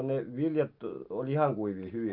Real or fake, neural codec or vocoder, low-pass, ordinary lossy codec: real; none; 5.4 kHz; Opus, 24 kbps